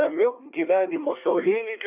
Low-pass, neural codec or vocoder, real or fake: 3.6 kHz; codec, 24 kHz, 1 kbps, SNAC; fake